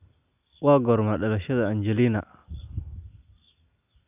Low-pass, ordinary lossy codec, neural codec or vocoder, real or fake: 3.6 kHz; none; none; real